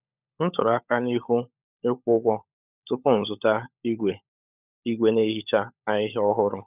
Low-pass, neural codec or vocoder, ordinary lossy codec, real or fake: 3.6 kHz; codec, 16 kHz, 16 kbps, FunCodec, trained on LibriTTS, 50 frames a second; none; fake